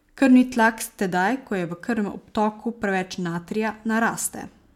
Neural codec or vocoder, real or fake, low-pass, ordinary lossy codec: none; real; 19.8 kHz; MP3, 96 kbps